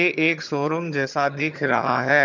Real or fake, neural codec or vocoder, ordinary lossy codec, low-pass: fake; vocoder, 22.05 kHz, 80 mel bands, HiFi-GAN; none; 7.2 kHz